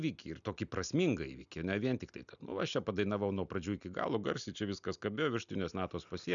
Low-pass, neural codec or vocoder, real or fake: 7.2 kHz; none; real